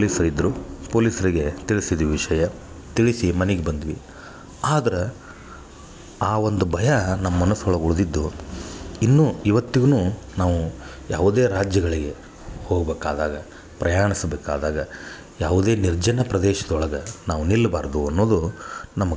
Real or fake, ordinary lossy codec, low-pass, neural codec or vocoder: real; none; none; none